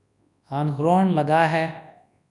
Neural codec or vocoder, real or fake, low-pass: codec, 24 kHz, 0.9 kbps, WavTokenizer, large speech release; fake; 10.8 kHz